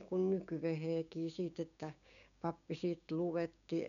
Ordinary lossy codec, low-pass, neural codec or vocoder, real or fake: none; 7.2 kHz; codec, 16 kHz, 6 kbps, DAC; fake